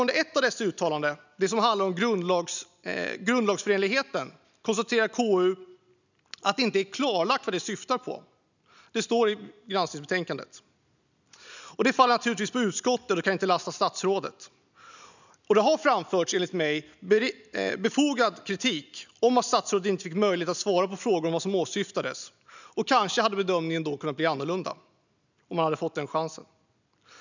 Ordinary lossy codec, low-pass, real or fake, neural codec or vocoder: none; 7.2 kHz; real; none